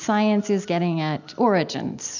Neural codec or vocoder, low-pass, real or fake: none; 7.2 kHz; real